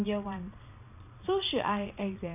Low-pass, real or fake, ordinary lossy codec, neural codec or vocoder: 3.6 kHz; fake; none; vocoder, 22.05 kHz, 80 mel bands, Vocos